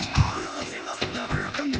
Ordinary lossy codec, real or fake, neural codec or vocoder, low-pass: none; fake; codec, 16 kHz, 0.8 kbps, ZipCodec; none